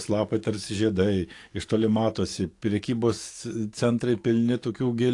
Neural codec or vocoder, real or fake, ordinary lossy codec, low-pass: codec, 44.1 kHz, 7.8 kbps, DAC; fake; AAC, 48 kbps; 10.8 kHz